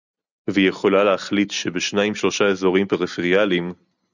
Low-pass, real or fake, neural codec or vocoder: 7.2 kHz; real; none